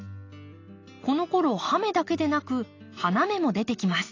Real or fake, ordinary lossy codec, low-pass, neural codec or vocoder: real; AAC, 32 kbps; 7.2 kHz; none